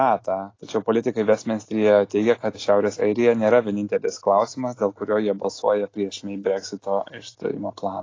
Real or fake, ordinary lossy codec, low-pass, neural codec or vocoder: real; AAC, 32 kbps; 7.2 kHz; none